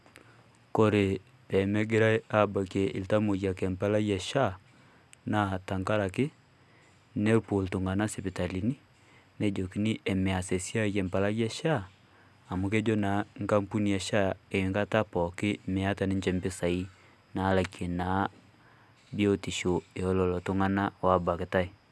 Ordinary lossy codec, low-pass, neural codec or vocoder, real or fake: none; none; none; real